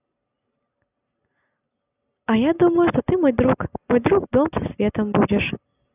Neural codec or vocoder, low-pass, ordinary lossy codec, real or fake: none; 3.6 kHz; none; real